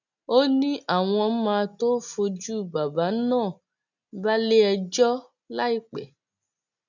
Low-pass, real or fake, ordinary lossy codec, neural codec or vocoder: 7.2 kHz; real; none; none